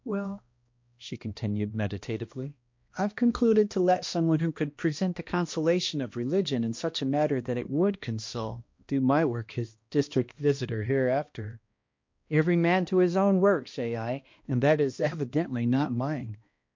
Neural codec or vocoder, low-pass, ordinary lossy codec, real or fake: codec, 16 kHz, 1 kbps, X-Codec, HuBERT features, trained on balanced general audio; 7.2 kHz; MP3, 48 kbps; fake